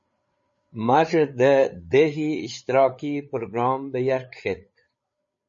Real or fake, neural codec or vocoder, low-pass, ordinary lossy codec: fake; codec, 16 kHz, 8 kbps, FreqCodec, larger model; 7.2 kHz; MP3, 32 kbps